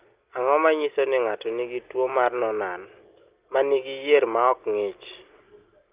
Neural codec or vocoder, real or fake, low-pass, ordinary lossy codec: none; real; 3.6 kHz; Opus, 32 kbps